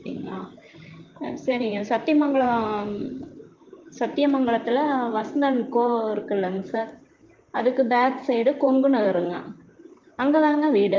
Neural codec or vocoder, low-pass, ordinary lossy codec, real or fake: vocoder, 44.1 kHz, 128 mel bands, Pupu-Vocoder; 7.2 kHz; Opus, 24 kbps; fake